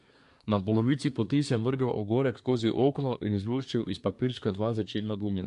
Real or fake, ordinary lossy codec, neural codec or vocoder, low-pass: fake; AAC, 64 kbps; codec, 24 kHz, 1 kbps, SNAC; 10.8 kHz